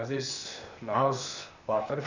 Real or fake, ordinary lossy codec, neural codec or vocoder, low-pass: fake; Opus, 64 kbps; codec, 16 kHz, 0.8 kbps, ZipCodec; 7.2 kHz